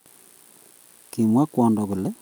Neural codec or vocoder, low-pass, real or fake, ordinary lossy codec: none; none; real; none